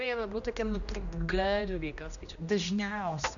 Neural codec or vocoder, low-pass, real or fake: codec, 16 kHz, 1 kbps, X-Codec, HuBERT features, trained on general audio; 7.2 kHz; fake